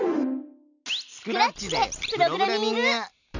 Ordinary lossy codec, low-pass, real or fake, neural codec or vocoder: none; 7.2 kHz; real; none